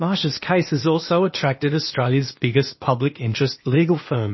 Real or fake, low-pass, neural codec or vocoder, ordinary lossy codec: fake; 7.2 kHz; codec, 16 kHz, 0.8 kbps, ZipCodec; MP3, 24 kbps